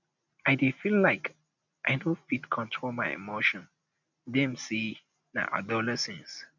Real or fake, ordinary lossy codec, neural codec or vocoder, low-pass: real; none; none; 7.2 kHz